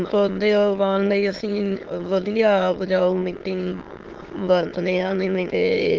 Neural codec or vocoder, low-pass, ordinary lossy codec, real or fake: autoencoder, 22.05 kHz, a latent of 192 numbers a frame, VITS, trained on many speakers; 7.2 kHz; Opus, 16 kbps; fake